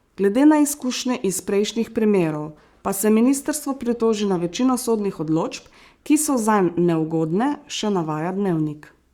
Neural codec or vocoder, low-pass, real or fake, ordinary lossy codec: codec, 44.1 kHz, 7.8 kbps, Pupu-Codec; 19.8 kHz; fake; Opus, 64 kbps